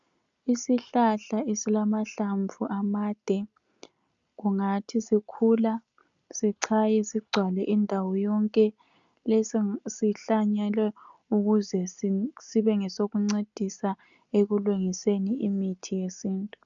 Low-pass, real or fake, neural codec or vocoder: 7.2 kHz; real; none